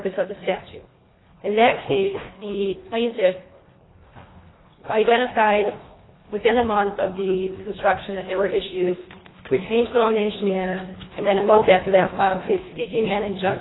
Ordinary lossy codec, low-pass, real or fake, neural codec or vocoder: AAC, 16 kbps; 7.2 kHz; fake; codec, 24 kHz, 1.5 kbps, HILCodec